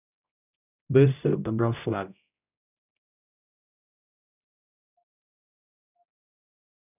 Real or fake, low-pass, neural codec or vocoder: fake; 3.6 kHz; codec, 16 kHz, 0.5 kbps, X-Codec, HuBERT features, trained on general audio